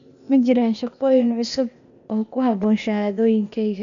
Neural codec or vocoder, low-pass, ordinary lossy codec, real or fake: codec, 16 kHz, 0.8 kbps, ZipCodec; 7.2 kHz; MP3, 96 kbps; fake